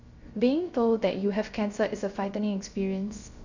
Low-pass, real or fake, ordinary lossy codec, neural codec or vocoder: 7.2 kHz; fake; Opus, 64 kbps; codec, 16 kHz, 0.3 kbps, FocalCodec